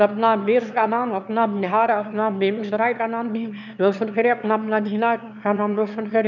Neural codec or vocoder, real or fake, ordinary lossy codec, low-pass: autoencoder, 22.05 kHz, a latent of 192 numbers a frame, VITS, trained on one speaker; fake; none; 7.2 kHz